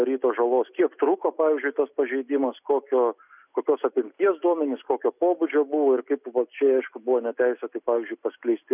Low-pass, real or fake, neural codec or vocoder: 3.6 kHz; real; none